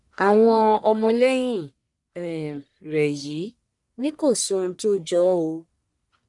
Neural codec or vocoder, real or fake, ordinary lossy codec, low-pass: codec, 44.1 kHz, 1.7 kbps, Pupu-Codec; fake; none; 10.8 kHz